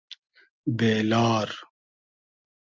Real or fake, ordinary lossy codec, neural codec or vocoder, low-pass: real; Opus, 32 kbps; none; 7.2 kHz